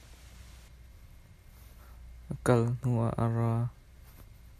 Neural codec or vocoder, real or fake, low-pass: none; real; 14.4 kHz